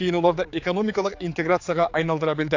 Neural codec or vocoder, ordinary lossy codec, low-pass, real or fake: codec, 16 kHz, 4 kbps, X-Codec, HuBERT features, trained on general audio; AAC, 48 kbps; 7.2 kHz; fake